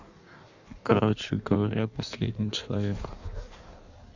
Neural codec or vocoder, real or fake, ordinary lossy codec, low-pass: codec, 16 kHz in and 24 kHz out, 1.1 kbps, FireRedTTS-2 codec; fake; none; 7.2 kHz